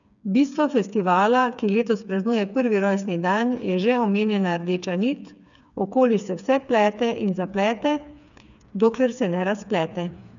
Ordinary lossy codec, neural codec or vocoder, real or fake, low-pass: none; codec, 16 kHz, 4 kbps, FreqCodec, smaller model; fake; 7.2 kHz